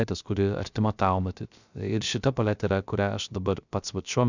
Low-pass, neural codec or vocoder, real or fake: 7.2 kHz; codec, 16 kHz, 0.3 kbps, FocalCodec; fake